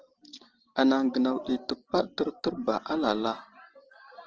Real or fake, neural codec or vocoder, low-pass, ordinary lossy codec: real; none; 7.2 kHz; Opus, 16 kbps